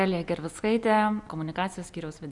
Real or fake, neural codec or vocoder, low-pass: real; none; 10.8 kHz